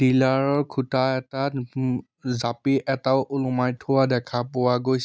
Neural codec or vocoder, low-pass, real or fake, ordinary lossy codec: none; none; real; none